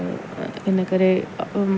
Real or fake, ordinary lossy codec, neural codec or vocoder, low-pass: real; none; none; none